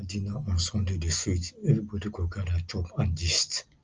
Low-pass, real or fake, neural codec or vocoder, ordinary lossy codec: 7.2 kHz; real; none; Opus, 24 kbps